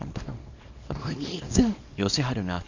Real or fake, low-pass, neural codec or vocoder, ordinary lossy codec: fake; 7.2 kHz; codec, 24 kHz, 0.9 kbps, WavTokenizer, small release; MP3, 48 kbps